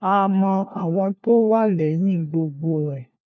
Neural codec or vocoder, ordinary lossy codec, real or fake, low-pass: codec, 16 kHz, 1 kbps, FunCodec, trained on LibriTTS, 50 frames a second; none; fake; none